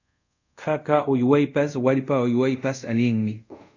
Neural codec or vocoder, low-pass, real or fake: codec, 24 kHz, 0.5 kbps, DualCodec; 7.2 kHz; fake